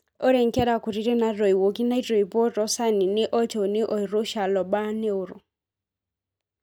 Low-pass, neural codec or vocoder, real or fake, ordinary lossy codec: 19.8 kHz; none; real; none